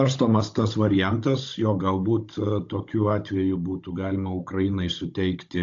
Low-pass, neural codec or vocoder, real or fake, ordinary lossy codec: 7.2 kHz; codec, 16 kHz, 16 kbps, FunCodec, trained on Chinese and English, 50 frames a second; fake; AAC, 48 kbps